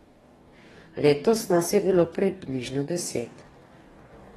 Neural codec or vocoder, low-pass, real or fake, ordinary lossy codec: codec, 44.1 kHz, 2.6 kbps, DAC; 19.8 kHz; fake; AAC, 32 kbps